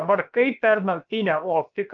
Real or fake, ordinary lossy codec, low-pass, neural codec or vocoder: fake; Opus, 24 kbps; 7.2 kHz; codec, 16 kHz, about 1 kbps, DyCAST, with the encoder's durations